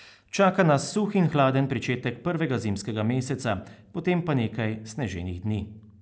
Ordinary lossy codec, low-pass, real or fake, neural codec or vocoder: none; none; real; none